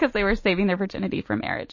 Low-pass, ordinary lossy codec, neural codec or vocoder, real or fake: 7.2 kHz; MP3, 32 kbps; none; real